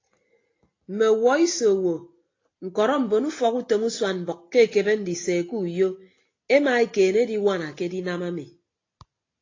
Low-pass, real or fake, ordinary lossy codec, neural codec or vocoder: 7.2 kHz; real; AAC, 32 kbps; none